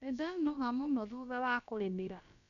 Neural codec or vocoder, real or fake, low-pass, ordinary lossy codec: codec, 16 kHz, about 1 kbps, DyCAST, with the encoder's durations; fake; 7.2 kHz; none